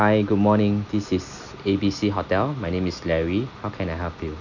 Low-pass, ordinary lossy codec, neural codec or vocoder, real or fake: 7.2 kHz; none; none; real